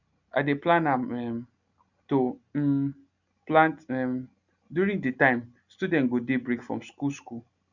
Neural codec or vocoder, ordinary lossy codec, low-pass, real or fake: none; none; 7.2 kHz; real